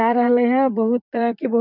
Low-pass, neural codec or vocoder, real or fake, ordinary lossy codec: 5.4 kHz; vocoder, 44.1 kHz, 128 mel bands, Pupu-Vocoder; fake; none